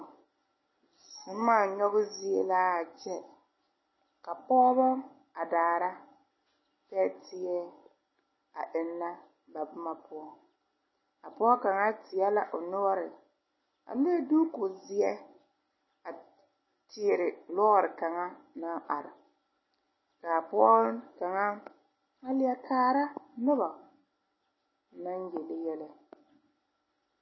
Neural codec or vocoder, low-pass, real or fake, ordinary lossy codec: none; 7.2 kHz; real; MP3, 24 kbps